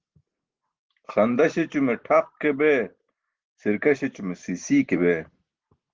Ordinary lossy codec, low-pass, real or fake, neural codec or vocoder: Opus, 16 kbps; 7.2 kHz; real; none